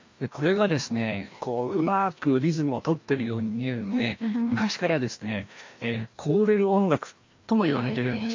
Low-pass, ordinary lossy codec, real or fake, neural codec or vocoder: 7.2 kHz; MP3, 48 kbps; fake; codec, 16 kHz, 1 kbps, FreqCodec, larger model